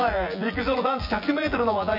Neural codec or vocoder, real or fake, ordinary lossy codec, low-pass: vocoder, 24 kHz, 100 mel bands, Vocos; fake; none; 5.4 kHz